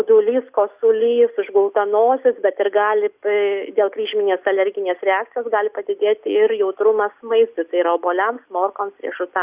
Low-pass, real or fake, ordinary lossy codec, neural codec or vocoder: 3.6 kHz; real; Opus, 64 kbps; none